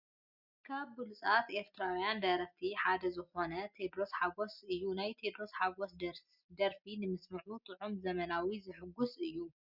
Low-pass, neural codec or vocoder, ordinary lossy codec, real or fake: 5.4 kHz; none; Opus, 64 kbps; real